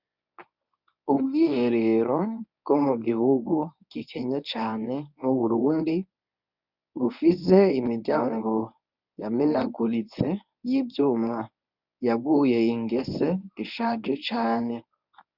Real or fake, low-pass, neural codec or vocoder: fake; 5.4 kHz; codec, 24 kHz, 0.9 kbps, WavTokenizer, medium speech release version 1